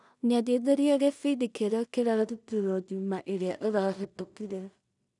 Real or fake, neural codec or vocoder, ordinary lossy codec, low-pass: fake; codec, 16 kHz in and 24 kHz out, 0.4 kbps, LongCat-Audio-Codec, two codebook decoder; none; 10.8 kHz